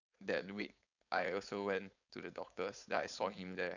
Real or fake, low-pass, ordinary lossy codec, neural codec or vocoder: fake; 7.2 kHz; none; codec, 16 kHz, 4.8 kbps, FACodec